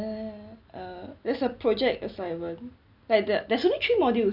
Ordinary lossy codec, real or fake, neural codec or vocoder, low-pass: none; real; none; 5.4 kHz